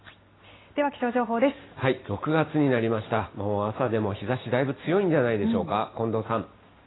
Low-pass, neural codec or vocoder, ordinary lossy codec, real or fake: 7.2 kHz; none; AAC, 16 kbps; real